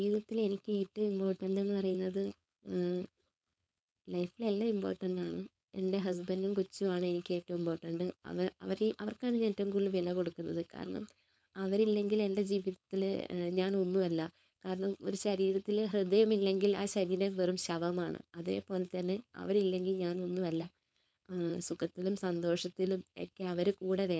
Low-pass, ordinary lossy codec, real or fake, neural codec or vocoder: none; none; fake; codec, 16 kHz, 4.8 kbps, FACodec